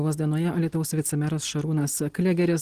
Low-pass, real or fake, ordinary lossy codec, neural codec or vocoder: 14.4 kHz; real; Opus, 16 kbps; none